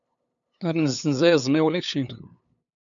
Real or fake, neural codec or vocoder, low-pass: fake; codec, 16 kHz, 8 kbps, FunCodec, trained on LibriTTS, 25 frames a second; 7.2 kHz